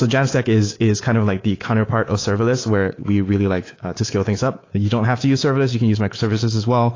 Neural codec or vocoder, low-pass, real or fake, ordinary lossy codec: codec, 24 kHz, 3.1 kbps, DualCodec; 7.2 kHz; fake; AAC, 32 kbps